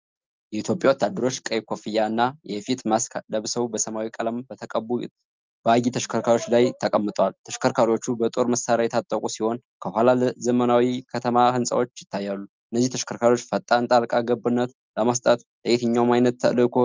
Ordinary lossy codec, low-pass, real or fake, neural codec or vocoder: Opus, 24 kbps; 7.2 kHz; real; none